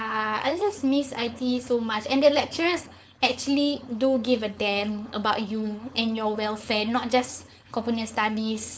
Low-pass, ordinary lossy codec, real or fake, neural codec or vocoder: none; none; fake; codec, 16 kHz, 4.8 kbps, FACodec